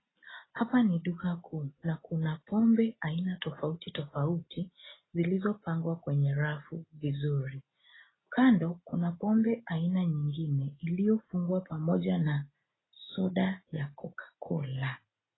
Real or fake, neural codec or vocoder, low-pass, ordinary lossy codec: real; none; 7.2 kHz; AAC, 16 kbps